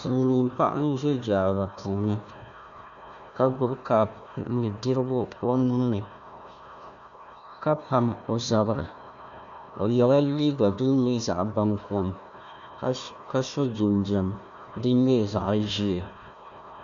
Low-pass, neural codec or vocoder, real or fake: 7.2 kHz; codec, 16 kHz, 1 kbps, FunCodec, trained on Chinese and English, 50 frames a second; fake